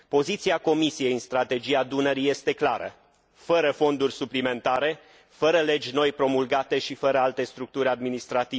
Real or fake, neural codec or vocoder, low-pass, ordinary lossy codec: real; none; none; none